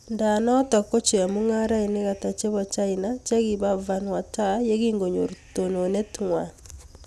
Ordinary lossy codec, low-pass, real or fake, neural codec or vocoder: none; none; real; none